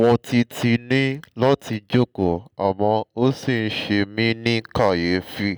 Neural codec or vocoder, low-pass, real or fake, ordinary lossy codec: none; none; real; none